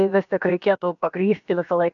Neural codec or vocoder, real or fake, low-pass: codec, 16 kHz, about 1 kbps, DyCAST, with the encoder's durations; fake; 7.2 kHz